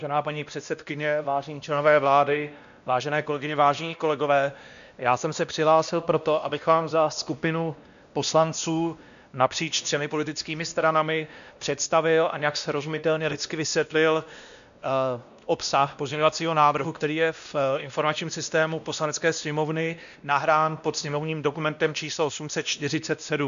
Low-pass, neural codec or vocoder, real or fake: 7.2 kHz; codec, 16 kHz, 1 kbps, X-Codec, WavLM features, trained on Multilingual LibriSpeech; fake